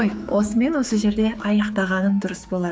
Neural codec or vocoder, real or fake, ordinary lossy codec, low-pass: codec, 16 kHz, 4 kbps, X-Codec, HuBERT features, trained on general audio; fake; none; none